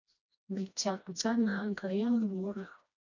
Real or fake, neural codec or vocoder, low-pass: fake; codec, 16 kHz, 1 kbps, FreqCodec, smaller model; 7.2 kHz